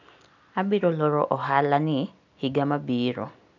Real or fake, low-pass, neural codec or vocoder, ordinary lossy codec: real; 7.2 kHz; none; none